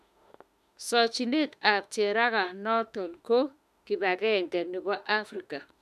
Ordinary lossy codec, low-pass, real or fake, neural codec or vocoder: none; 14.4 kHz; fake; autoencoder, 48 kHz, 32 numbers a frame, DAC-VAE, trained on Japanese speech